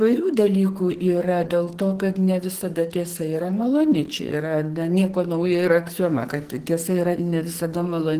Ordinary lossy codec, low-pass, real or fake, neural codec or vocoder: Opus, 16 kbps; 14.4 kHz; fake; codec, 44.1 kHz, 2.6 kbps, SNAC